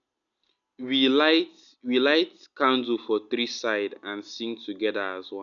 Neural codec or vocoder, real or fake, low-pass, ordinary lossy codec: none; real; 7.2 kHz; none